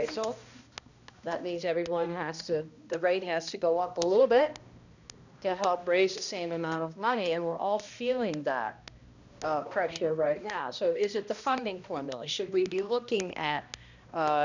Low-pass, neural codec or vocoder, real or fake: 7.2 kHz; codec, 16 kHz, 1 kbps, X-Codec, HuBERT features, trained on balanced general audio; fake